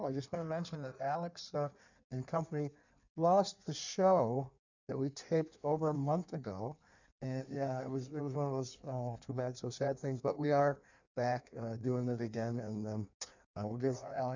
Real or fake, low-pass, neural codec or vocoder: fake; 7.2 kHz; codec, 16 kHz in and 24 kHz out, 1.1 kbps, FireRedTTS-2 codec